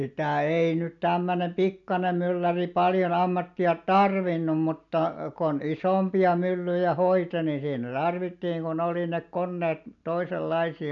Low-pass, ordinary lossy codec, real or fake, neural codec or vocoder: 7.2 kHz; none; real; none